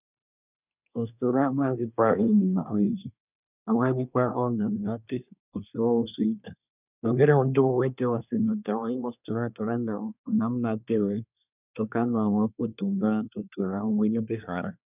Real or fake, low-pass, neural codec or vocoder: fake; 3.6 kHz; codec, 24 kHz, 1 kbps, SNAC